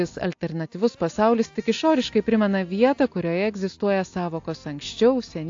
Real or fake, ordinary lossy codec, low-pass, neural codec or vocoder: real; AAC, 48 kbps; 7.2 kHz; none